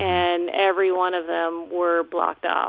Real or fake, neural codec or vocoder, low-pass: real; none; 5.4 kHz